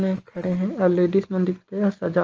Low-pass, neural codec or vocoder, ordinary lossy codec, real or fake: 7.2 kHz; none; Opus, 24 kbps; real